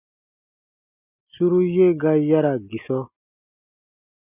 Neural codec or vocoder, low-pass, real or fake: none; 3.6 kHz; real